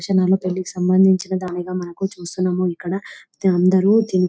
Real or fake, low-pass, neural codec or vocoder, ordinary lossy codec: real; none; none; none